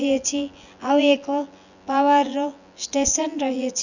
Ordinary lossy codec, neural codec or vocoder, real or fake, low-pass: none; vocoder, 24 kHz, 100 mel bands, Vocos; fake; 7.2 kHz